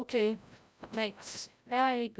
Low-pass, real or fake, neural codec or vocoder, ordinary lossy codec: none; fake; codec, 16 kHz, 0.5 kbps, FreqCodec, larger model; none